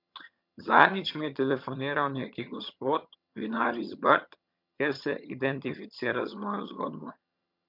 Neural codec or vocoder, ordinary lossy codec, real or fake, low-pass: vocoder, 22.05 kHz, 80 mel bands, HiFi-GAN; MP3, 48 kbps; fake; 5.4 kHz